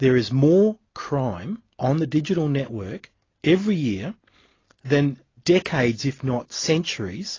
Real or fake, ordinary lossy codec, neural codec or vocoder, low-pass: real; AAC, 32 kbps; none; 7.2 kHz